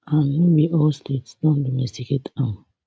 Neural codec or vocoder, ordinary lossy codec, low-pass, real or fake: none; none; none; real